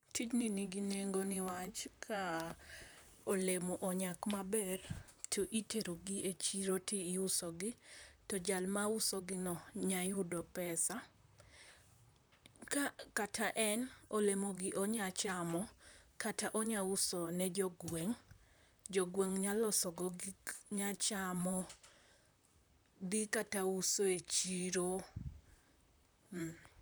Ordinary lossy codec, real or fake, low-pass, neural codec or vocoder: none; fake; none; vocoder, 44.1 kHz, 128 mel bands, Pupu-Vocoder